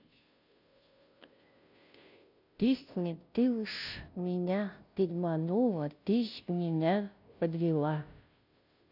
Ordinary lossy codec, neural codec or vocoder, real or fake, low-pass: none; codec, 16 kHz, 0.5 kbps, FunCodec, trained on Chinese and English, 25 frames a second; fake; 5.4 kHz